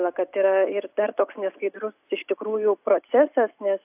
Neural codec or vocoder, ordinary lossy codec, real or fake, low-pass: none; AAC, 32 kbps; real; 3.6 kHz